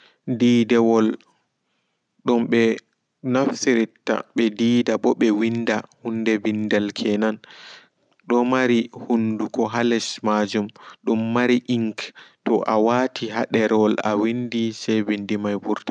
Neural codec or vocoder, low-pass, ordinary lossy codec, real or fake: none; 9.9 kHz; none; real